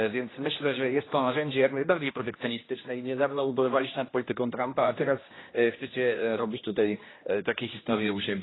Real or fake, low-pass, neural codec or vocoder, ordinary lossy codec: fake; 7.2 kHz; codec, 16 kHz, 1 kbps, X-Codec, HuBERT features, trained on general audio; AAC, 16 kbps